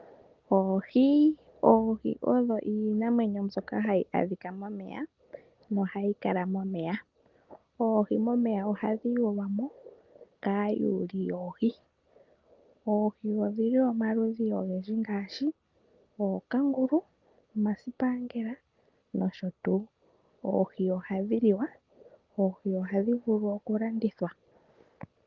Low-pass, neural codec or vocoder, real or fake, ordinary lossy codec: 7.2 kHz; none; real; Opus, 32 kbps